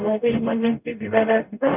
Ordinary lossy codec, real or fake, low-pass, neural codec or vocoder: none; fake; 3.6 kHz; codec, 44.1 kHz, 0.9 kbps, DAC